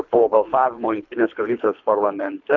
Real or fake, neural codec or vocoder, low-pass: fake; codec, 24 kHz, 3 kbps, HILCodec; 7.2 kHz